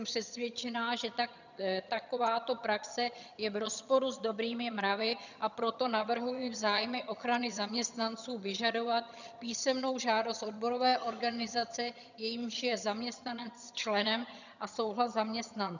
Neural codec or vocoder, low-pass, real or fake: vocoder, 22.05 kHz, 80 mel bands, HiFi-GAN; 7.2 kHz; fake